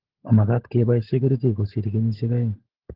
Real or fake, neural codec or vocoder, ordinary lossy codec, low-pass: fake; codec, 16 kHz, 16 kbps, FunCodec, trained on LibriTTS, 50 frames a second; Opus, 16 kbps; 5.4 kHz